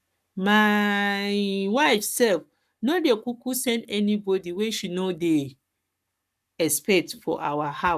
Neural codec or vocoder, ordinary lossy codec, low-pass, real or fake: codec, 44.1 kHz, 7.8 kbps, Pupu-Codec; none; 14.4 kHz; fake